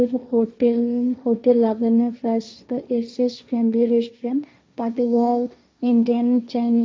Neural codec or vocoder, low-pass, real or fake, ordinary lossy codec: codec, 16 kHz, 1.1 kbps, Voila-Tokenizer; none; fake; none